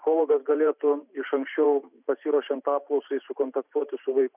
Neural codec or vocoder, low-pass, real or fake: vocoder, 44.1 kHz, 128 mel bands every 512 samples, BigVGAN v2; 3.6 kHz; fake